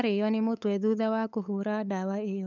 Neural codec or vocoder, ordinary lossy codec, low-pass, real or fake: codec, 16 kHz, 8 kbps, FunCodec, trained on LibriTTS, 25 frames a second; none; 7.2 kHz; fake